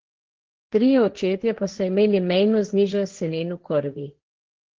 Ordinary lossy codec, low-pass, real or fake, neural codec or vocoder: Opus, 16 kbps; 7.2 kHz; fake; codec, 16 kHz, 1.1 kbps, Voila-Tokenizer